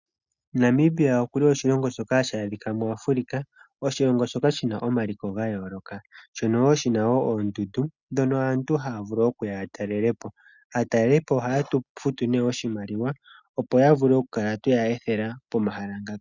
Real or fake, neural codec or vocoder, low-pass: real; none; 7.2 kHz